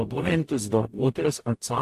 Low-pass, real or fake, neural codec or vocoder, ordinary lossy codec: 14.4 kHz; fake; codec, 44.1 kHz, 0.9 kbps, DAC; AAC, 64 kbps